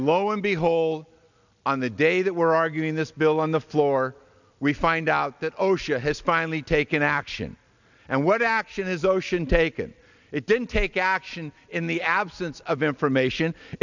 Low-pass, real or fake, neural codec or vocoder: 7.2 kHz; real; none